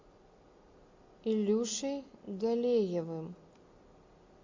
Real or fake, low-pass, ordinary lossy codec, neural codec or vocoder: real; 7.2 kHz; MP3, 48 kbps; none